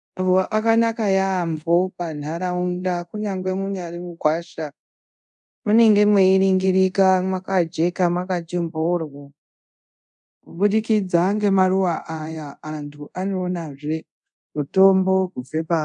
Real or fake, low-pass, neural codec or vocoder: fake; 10.8 kHz; codec, 24 kHz, 0.5 kbps, DualCodec